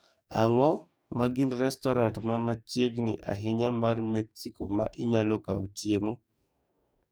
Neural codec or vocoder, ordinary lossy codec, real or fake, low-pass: codec, 44.1 kHz, 2.6 kbps, DAC; none; fake; none